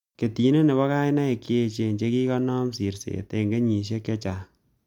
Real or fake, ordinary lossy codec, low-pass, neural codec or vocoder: real; MP3, 96 kbps; 19.8 kHz; none